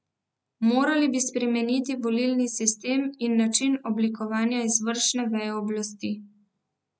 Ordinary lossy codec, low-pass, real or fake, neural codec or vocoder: none; none; real; none